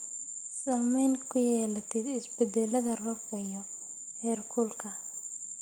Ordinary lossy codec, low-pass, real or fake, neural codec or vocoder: Opus, 24 kbps; 19.8 kHz; real; none